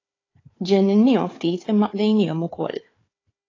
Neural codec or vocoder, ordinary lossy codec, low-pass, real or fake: codec, 16 kHz, 4 kbps, FunCodec, trained on Chinese and English, 50 frames a second; AAC, 32 kbps; 7.2 kHz; fake